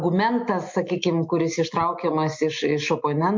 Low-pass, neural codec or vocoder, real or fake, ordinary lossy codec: 7.2 kHz; none; real; MP3, 48 kbps